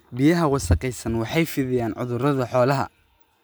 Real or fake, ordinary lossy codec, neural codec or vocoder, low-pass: real; none; none; none